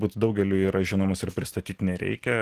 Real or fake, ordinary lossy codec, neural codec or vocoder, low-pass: real; Opus, 16 kbps; none; 14.4 kHz